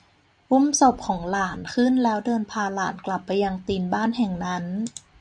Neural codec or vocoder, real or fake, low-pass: none; real; 9.9 kHz